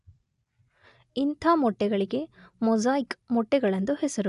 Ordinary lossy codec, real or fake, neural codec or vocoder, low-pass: AAC, 96 kbps; real; none; 10.8 kHz